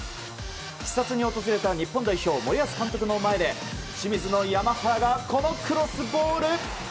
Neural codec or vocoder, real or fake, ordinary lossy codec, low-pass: none; real; none; none